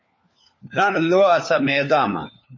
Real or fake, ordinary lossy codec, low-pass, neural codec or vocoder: fake; MP3, 32 kbps; 7.2 kHz; codec, 16 kHz, 4 kbps, FunCodec, trained on LibriTTS, 50 frames a second